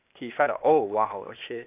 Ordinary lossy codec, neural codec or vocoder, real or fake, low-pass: none; codec, 16 kHz, 0.8 kbps, ZipCodec; fake; 3.6 kHz